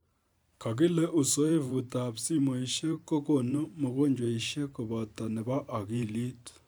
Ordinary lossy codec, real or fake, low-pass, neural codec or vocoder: none; fake; none; vocoder, 44.1 kHz, 128 mel bands every 512 samples, BigVGAN v2